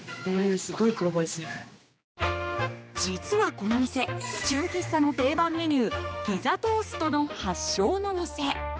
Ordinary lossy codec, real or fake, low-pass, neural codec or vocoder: none; fake; none; codec, 16 kHz, 2 kbps, X-Codec, HuBERT features, trained on general audio